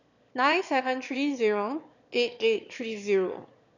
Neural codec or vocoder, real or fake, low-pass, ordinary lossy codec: autoencoder, 22.05 kHz, a latent of 192 numbers a frame, VITS, trained on one speaker; fake; 7.2 kHz; none